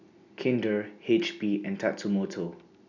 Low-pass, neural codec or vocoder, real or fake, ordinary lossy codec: 7.2 kHz; none; real; none